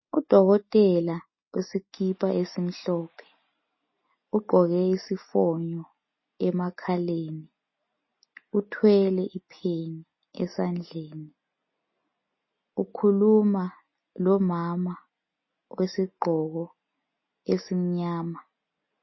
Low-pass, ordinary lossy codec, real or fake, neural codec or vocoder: 7.2 kHz; MP3, 24 kbps; real; none